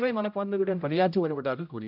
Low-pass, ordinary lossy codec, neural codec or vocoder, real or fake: 5.4 kHz; none; codec, 16 kHz, 0.5 kbps, X-Codec, HuBERT features, trained on general audio; fake